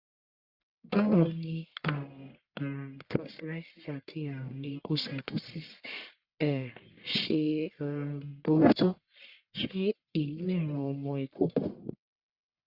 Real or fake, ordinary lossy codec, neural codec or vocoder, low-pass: fake; Opus, 64 kbps; codec, 44.1 kHz, 1.7 kbps, Pupu-Codec; 5.4 kHz